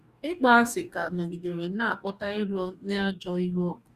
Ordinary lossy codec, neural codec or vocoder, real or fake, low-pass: Opus, 64 kbps; codec, 44.1 kHz, 2.6 kbps, DAC; fake; 14.4 kHz